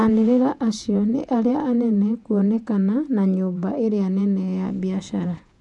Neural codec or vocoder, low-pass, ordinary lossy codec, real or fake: vocoder, 48 kHz, 128 mel bands, Vocos; 10.8 kHz; MP3, 96 kbps; fake